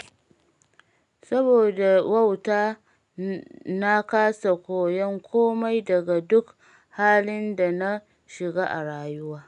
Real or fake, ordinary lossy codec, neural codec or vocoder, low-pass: real; none; none; 10.8 kHz